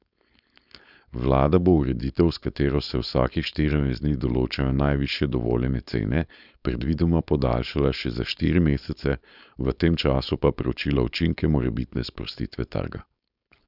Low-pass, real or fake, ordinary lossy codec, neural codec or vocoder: 5.4 kHz; fake; none; codec, 16 kHz, 4.8 kbps, FACodec